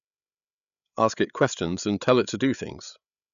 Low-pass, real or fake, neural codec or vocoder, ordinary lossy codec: 7.2 kHz; fake; codec, 16 kHz, 8 kbps, FreqCodec, larger model; none